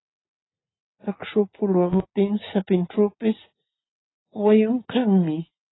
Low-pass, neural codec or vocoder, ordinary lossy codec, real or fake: 7.2 kHz; none; AAC, 16 kbps; real